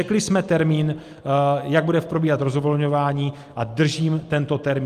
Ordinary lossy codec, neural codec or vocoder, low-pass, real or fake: Opus, 32 kbps; none; 14.4 kHz; real